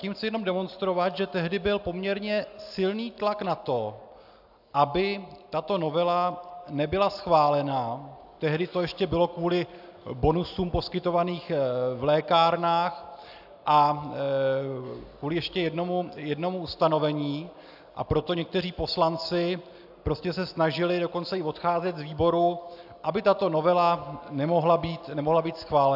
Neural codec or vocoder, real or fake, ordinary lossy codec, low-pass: none; real; AAC, 48 kbps; 5.4 kHz